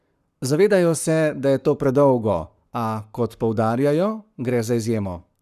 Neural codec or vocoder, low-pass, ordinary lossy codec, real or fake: codec, 44.1 kHz, 7.8 kbps, Pupu-Codec; 14.4 kHz; none; fake